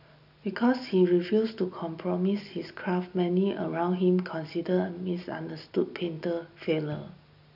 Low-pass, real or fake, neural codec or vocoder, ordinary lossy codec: 5.4 kHz; real; none; none